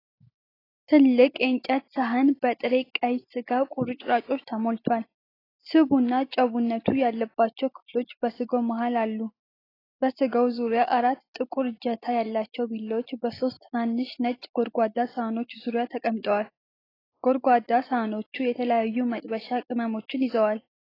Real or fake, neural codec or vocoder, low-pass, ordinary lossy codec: real; none; 5.4 kHz; AAC, 24 kbps